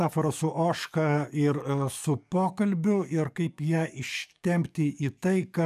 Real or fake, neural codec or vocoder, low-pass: fake; codec, 44.1 kHz, 7.8 kbps, DAC; 14.4 kHz